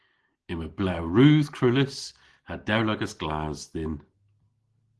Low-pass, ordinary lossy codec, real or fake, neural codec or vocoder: 10.8 kHz; Opus, 16 kbps; real; none